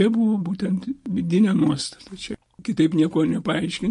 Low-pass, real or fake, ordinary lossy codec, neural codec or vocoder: 14.4 kHz; real; MP3, 48 kbps; none